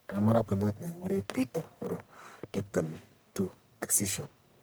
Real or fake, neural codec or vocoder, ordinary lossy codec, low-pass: fake; codec, 44.1 kHz, 1.7 kbps, Pupu-Codec; none; none